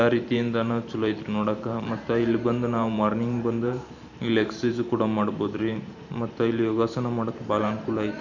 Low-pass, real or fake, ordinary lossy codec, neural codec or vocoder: 7.2 kHz; fake; none; vocoder, 44.1 kHz, 128 mel bands every 512 samples, BigVGAN v2